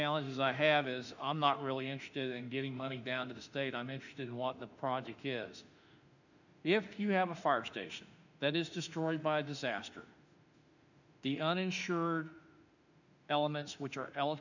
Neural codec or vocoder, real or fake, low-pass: autoencoder, 48 kHz, 32 numbers a frame, DAC-VAE, trained on Japanese speech; fake; 7.2 kHz